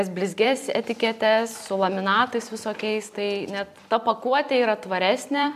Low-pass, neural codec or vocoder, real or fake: 14.4 kHz; vocoder, 44.1 kHz, 128 mel bands every 256 samples, BigVGAN v2; fake